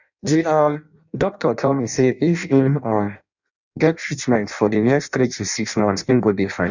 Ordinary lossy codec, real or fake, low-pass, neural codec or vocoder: none; fake; 7.2 kHz; codec, 16 kHz in and 24 kHz out, 0.6 kbps, FireRedTTS-2 codec